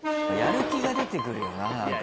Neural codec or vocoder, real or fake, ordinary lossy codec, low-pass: none; real; none; none